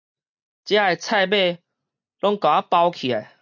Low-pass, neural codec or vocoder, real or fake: 7.2 kHz; none; real